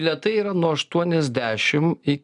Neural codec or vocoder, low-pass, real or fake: none; 10.8 kHz; real